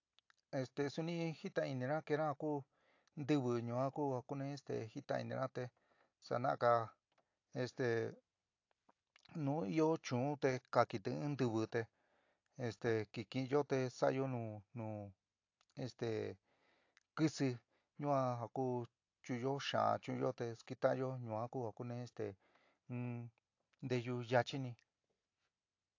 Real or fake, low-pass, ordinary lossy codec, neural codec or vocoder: real; 7.2 kHz; AAC, 48 kbps; none